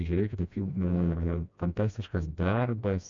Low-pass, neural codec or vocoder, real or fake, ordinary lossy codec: 7.2 kHz; codec, 16 kHz, 1 kbps, FreqCodec, smaller model; fake; MP3, 64 kbps